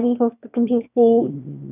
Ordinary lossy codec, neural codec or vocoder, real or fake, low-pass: none; autoencoder, 22.05 kHz, a latent of 192 numbers a frame, VITS, trained on one speaker; fake; 3.6 kHz